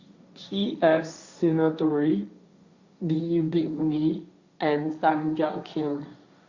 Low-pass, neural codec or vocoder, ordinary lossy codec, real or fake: 7.2 kHz; codec, 16 kHz, 1.1 kbps, Voila-Tokenizer; Opus, 64 kbps; fake